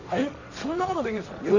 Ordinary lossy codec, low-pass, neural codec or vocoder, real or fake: none; 7.2 kHz; codec, 16 kHz, 1.1 kbps, Voila-Tokenizer; fake